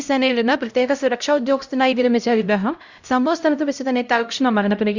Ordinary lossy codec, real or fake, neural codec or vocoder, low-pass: Opus, 64 kbps; fake; codec, 16 kHz, 0.5 kbps, X-Codec, HuBERT features, trained on LibriSpeech; 7.2 kHz